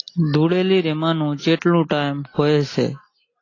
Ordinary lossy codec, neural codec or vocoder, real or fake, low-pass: AAC, 32 kbps; none; real; 7.2 kHz